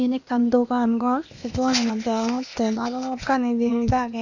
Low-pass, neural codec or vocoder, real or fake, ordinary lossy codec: 7.2 kHz; codec, 16 kHz, 0.8 kbps, ZipCodec; fake; none